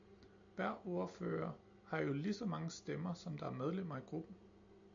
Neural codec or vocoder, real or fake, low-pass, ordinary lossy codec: none; real; 7.2 kHz; AAC, 64 kbps